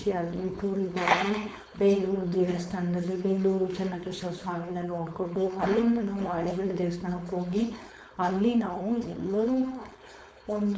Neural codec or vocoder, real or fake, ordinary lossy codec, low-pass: codec, 16 kHz, 4.8 kbps, FACodec; fake; none; none